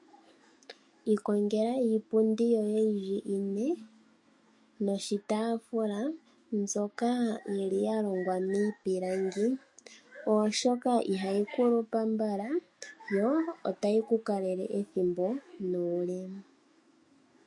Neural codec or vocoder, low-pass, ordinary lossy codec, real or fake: autoencoder, 48 kHz, 128 numbers a frame, DAC-VAE, trained on Japanese speech; 10.8 kHz; MP3, 48 kbps; fake